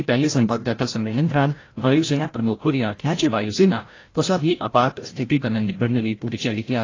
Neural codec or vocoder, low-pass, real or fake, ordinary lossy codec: codec, 16 kHz, 0.5 kbps, FreqCodec, larger model; 7.2 kHz; fake; AAC, 32 kbps